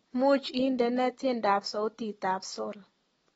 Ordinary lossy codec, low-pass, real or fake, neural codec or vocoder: AAC, 24 kbps; 19.8 kHz; real; none